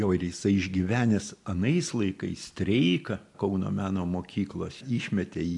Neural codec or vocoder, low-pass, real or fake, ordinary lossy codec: none; 10.8 kHz; real; AAC, 64 kbps